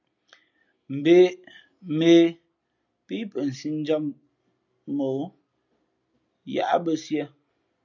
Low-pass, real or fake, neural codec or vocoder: 7.2 kHz; real; none